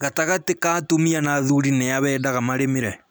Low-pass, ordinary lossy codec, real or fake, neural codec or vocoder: none; none; real; none